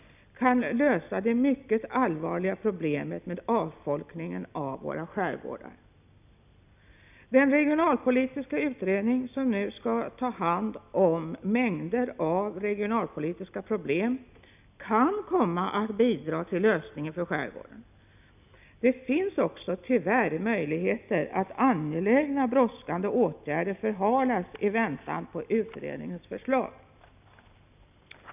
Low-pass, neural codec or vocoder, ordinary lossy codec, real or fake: 3.6 kHz; none; none; real